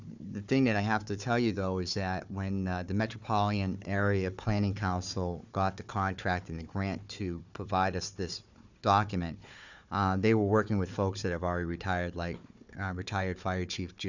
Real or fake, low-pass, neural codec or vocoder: fake; 7.2 kHz; codec, 16 kHz, 4 kbps, FunCodec, trained on Chinese and English, 50 frames a second